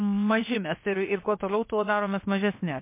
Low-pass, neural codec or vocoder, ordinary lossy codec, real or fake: 3.6 kHz; codec, 16 kHz, 0.7 kbps, FocalCodec; MP3, 24 kbps; fake